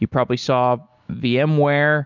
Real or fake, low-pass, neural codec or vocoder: real; 7.2 kHz; none